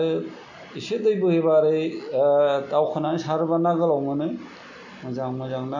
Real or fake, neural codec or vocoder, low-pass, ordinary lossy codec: real; none; 7.2 kHz; MP3, 48 kbps